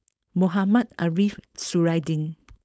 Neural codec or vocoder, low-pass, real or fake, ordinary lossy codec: codec, 16 kHz, 4.8 kbps, FACodec; none; fake; none